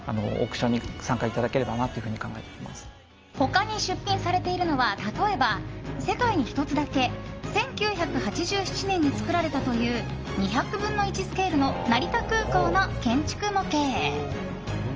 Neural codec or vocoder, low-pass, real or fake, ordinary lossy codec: none; 7.2 kHz; real; Opus, 24 kbps